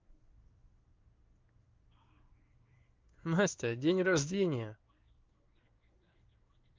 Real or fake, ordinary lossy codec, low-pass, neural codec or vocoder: fake; Opus, 32 kbps; 7.2 kHz; codec, 16 kHz in and 24 kHz out, 1 kbps, XY-Tokenizer